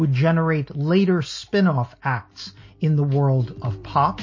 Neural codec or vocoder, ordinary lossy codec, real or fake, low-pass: none; MP3, 32 kbps; real; 7.2 kHz